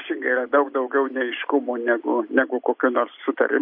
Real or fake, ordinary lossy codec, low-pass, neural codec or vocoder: real; MP3, 32 kbps; 5.4 kHz; none